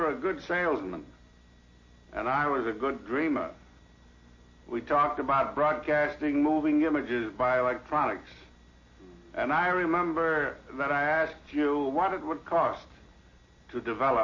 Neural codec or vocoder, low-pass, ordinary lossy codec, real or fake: none; 7.2 kHz; MP3, 32 kbps; real